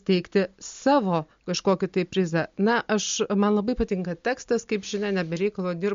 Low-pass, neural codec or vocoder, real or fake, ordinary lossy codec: 7.2 kHz; none; real; MP3, 48 kbps